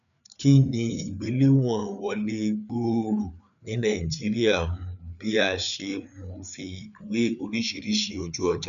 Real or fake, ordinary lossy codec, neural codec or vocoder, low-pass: fake; none; codec, 16 kHz, 4 kbps, FreqCodec, larger model; 7.2 kHz